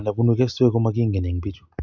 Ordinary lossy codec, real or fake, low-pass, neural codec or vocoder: none; real; 7.2 kHz; none